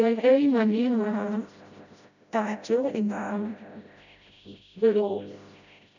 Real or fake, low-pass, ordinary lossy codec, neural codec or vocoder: fake; 7.2 kHz; none; codec, 16 kHz, 0.5 kbps, FreqCodec, smaller model